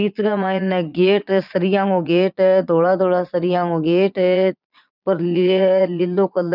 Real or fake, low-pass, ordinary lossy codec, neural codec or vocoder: fake; 5.4 kHz; none; vocoder, 22.05 kHz, 80 mel bands, Vocos